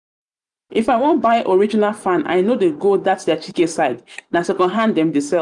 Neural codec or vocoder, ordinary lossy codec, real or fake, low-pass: vocoder, 44.1 kHz, 128 mel bands every 512 samples, BigVGAN v2; none; fake; 10.8 kHz